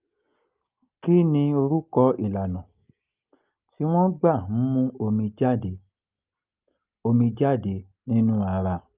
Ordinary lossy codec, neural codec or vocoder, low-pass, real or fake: Opus, 24 kbps; none; 3.6 kHz; real